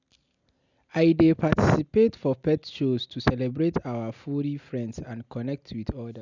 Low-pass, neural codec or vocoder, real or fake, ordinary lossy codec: 7.2 kHz; none; real; none